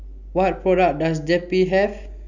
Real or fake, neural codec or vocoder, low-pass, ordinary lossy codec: real; none; 7.2 kHz; none